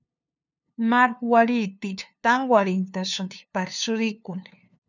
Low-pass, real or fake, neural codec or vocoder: 7.2 kHz; fake; codec, 16 kHz, 2 kbps, FunCodec, trained on LibriTTS, 25 frames a second